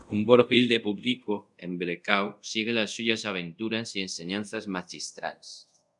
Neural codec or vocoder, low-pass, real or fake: codec, 24 kHz, 0.5 kbps, DualCodec; 10.8 kHz; fake